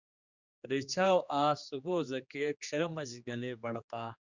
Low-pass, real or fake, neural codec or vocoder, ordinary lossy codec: 7.2 kHz; fake; codec, 16 kHz, 2 kbps, X-Codec, HuBERT features, trained on general audio; Opus, 64 kbps